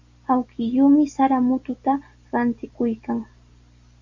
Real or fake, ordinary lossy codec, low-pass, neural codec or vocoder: real; Opus, 64 kbps; 7.2 kHz; none